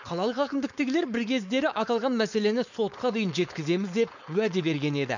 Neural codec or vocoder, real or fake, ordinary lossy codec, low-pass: codec, 16 kHz, 4.8 kbps, FACodec; fake; none; 7.2 kHz